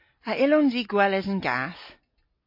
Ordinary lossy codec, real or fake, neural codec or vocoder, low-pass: MP3, 32 kbps; real; none; 5.4 kHz